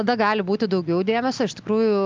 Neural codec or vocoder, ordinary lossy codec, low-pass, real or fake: none; Opus, 24 kbps; 7.2 kHz; real